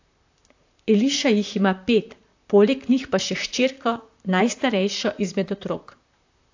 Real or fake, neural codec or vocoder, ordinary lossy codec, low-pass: fake; vocoder, 44.1 kHz, 128 mel bands, Pupu-Vocoder; AAC, 48 kbps; 7.2 kHz